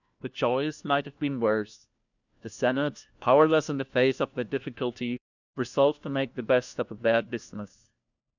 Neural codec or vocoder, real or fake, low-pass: codec, 16 kHz, 1 kbps, FunCodec, trained on LibriTTS, 50 frames a second; fake; 7.2 kHz